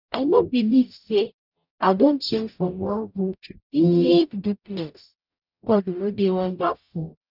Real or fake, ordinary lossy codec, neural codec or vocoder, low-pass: fake; none; codec, 44.1 kHz, 0.9 kbps, DAC; 5.4 kHz